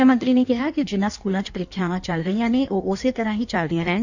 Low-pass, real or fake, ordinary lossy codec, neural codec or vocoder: 7.2 kHz; fake; none; codec, 16 kHz in and 24 kHz out, 1.1 kbps, FireRedTTS-2 codec